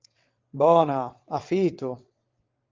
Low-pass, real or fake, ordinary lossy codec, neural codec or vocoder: 7.2 kHz; fake; Opus, 24 kbps; vocoder, 22.05 kHz, 80 mel bands, WaveNeXt